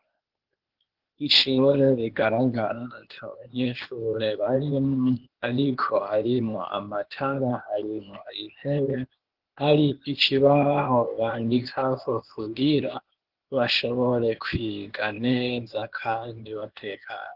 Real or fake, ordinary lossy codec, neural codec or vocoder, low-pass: fake; Opus, 16 kbps; codec, 16 kHz, 0.8 kbps, ZipCodec; 5.4 kHz